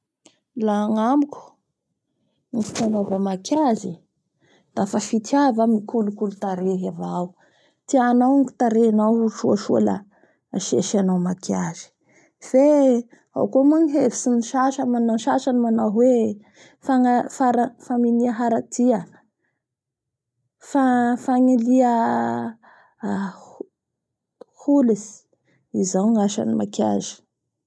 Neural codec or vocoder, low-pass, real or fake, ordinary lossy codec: none; none; real; none